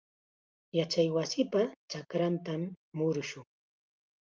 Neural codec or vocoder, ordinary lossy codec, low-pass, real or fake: none; Opus, 32 kbps; 7.2 kHz; real